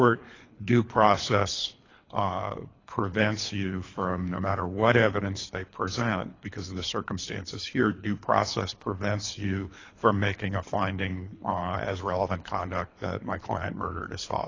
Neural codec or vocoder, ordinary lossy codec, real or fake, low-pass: codec, 24 kHz, 3 kbps, HILCodec; AAC, 32 kbps; fake; 7.2 kHz